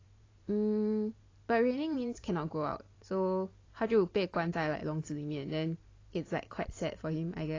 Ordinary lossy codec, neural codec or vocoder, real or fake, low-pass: AAC, 32 kbps; vocoder, 44.1 kHz, 128 mel bands every 512 samples, BigVGAN v2; fake; 7.2 kHz